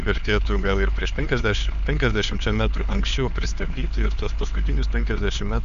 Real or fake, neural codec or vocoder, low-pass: fake; codec, 16 kHz, 4 kbps, X-Codec, HuBERT features, trained on LibriSpeech; 7.2 kHz